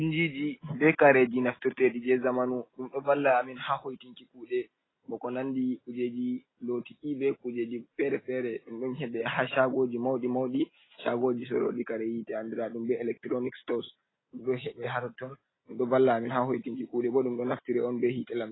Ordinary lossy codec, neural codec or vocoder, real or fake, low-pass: AAC, 16 kbps; none; real; 7.2 kHz